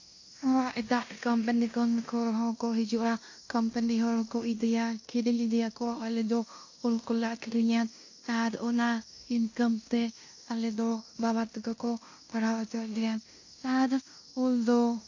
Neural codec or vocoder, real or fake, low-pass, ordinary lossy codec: codec, 16 kHz in and 24 kHz out, 0.9 kbps, LongCat-Audio-Codec, fine tuned four codebook decoder; fake; 7.2 kHz; AAC, 48 kbps